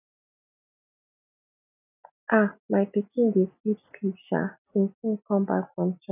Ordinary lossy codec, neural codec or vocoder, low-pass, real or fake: none; none; 3.6 kHz; real